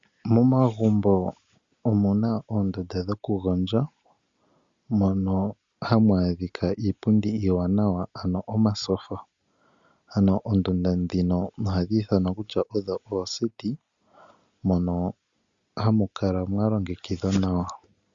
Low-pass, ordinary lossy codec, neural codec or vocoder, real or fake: 7.2 kHz; Opus, 64 kbps; none; real